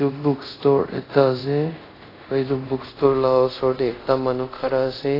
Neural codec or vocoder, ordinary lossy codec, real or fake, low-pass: codec, 24 kHz, 0.5 kbps, DualCodec; AAC, 24 kbps; fake; 5.4 kHz